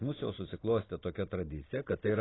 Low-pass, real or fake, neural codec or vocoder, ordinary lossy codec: 7.2 kHz; real; none; AAC, 16 kbps